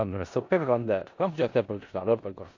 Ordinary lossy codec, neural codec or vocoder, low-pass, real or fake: AAC, 48 kbps; codec, 16 kHz in and 24 kHz out, 0.4 kbps, LongCat-Audio-Codec, four codebook decoder; 7.2 kHz; fake